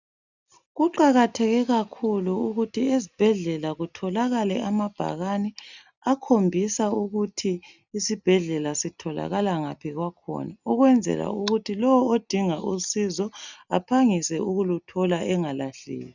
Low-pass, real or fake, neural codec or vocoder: 7.2 kHz; real; none